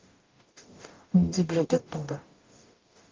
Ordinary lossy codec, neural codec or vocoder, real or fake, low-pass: Opus, 24 kbps; codec, 44.1 kHz, 0.9 kbps, DAC; fake; 7.2 kHz